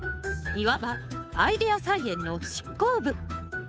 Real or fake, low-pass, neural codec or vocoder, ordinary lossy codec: fake; none; codec, 16 kHz, 2 kbps, FunCodec, trained on Chinese and English, 25 frames a second; none